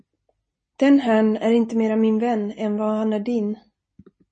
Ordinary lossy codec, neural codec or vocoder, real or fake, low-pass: MP3, 32 kbps; none; real; 10.8 kHz